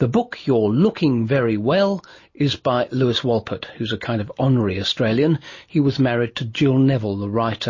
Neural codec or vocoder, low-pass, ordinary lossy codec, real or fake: none; 7.2 kHz; MP3, 32 kbps; real